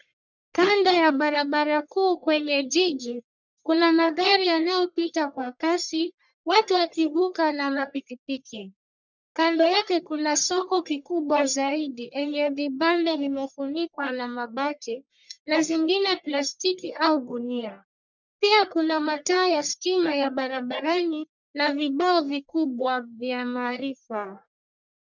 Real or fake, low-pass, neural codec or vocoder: fake; 7.2 kHz; codec, 44.1 kHz, 1.7 kbps, Pupu-Codec